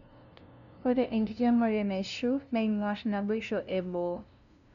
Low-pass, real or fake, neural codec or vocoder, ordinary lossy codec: 7.2 kHz; fake; codec, 16 kHz, 0.5 kbps, FunCodec, trained on LibriTTS, 25 frames a second; none